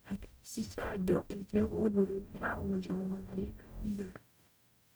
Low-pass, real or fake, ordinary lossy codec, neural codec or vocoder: none; fake; none; codec, 44.1 kHz, 0.9 kbps, DAC